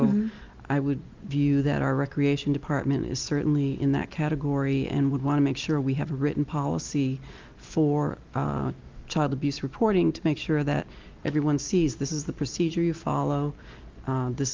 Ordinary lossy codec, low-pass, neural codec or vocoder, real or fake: Opus, 24 kbps; 7.2 kHz; none; real